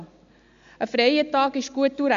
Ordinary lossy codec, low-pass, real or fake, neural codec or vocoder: MP3, 64 kbps; 7.2 kHz; real; none